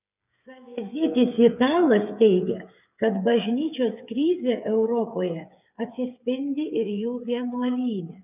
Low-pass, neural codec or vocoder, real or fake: 3.6 kHz; codec, 16 kHz, 8 kbps, FreqCodec, smaller model; fake